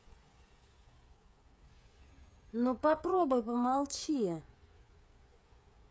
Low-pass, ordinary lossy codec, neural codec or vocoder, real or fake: none; none; codec, 16 kHz, 8 kbps, FreqCodec, smaller model; fake